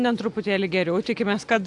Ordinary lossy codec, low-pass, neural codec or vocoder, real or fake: AAC, 64 kbps; 10.8 kHz; none; real